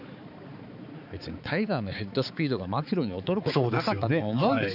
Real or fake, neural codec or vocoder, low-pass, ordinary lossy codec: fake; codec, 16 kHz, 4 kbps, X-Codec, HuBERT features, trained on balanced general audio; 5.4 kHz; none